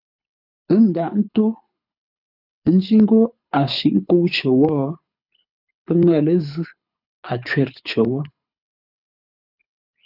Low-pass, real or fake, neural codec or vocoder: 5.4 kHz; fake; codec, 24 kHz, 6 kbps, HILCodec